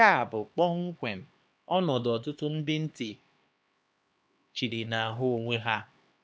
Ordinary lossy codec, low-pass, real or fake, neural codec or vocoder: none; none; fake; codec, 16 kHz, 2 kbps, X-Codec, HuBERT features, trained on LibriSpeech